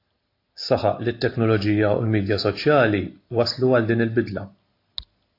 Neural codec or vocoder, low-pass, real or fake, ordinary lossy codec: none; 5.4 kHz; real; AAC, 32 kbps